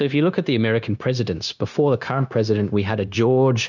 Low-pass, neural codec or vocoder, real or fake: 7.2 kHz; codec, 16 kHz, 0.9 kbps, LongCat-Audio-Codec; fake